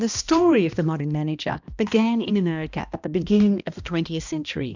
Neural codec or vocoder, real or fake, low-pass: codec, 16 kHz, 1 kbps, X-Codec, HuBERT features, trained on balanced general audio; fake; 7.2 kHz